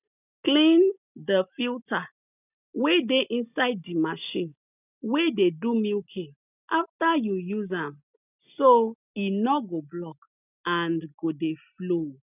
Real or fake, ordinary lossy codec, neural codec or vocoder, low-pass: real; none; none; 3.6 kHz